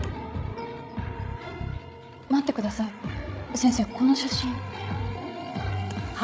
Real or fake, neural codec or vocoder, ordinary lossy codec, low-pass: fake; codec, 16 kHz, 16 kbps, FreqCodec, larger model; none; none